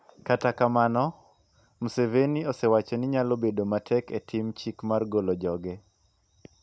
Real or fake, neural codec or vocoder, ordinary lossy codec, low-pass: real; none; none; none